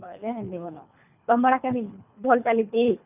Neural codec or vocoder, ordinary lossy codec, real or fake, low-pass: codec, 24 kHz, 3 kbps, HILCodec; none; fake; 3.6 kHz